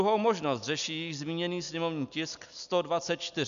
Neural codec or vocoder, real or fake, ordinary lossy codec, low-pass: none; real; MP3, 96 kbps; 7.2 kHz